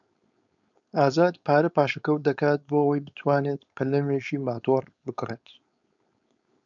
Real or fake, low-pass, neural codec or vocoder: fake; 7.2 kHz; codec, 16 kHz, 4.8 kbps, FACodec